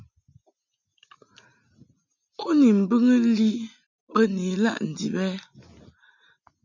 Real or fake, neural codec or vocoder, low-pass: real; none; 7.2 kHz